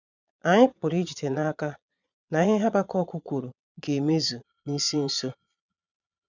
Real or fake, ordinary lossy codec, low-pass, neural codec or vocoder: fake; none; 7.2 kHz; vocoder, 22.05 kHz, 80 mel bands, Vocos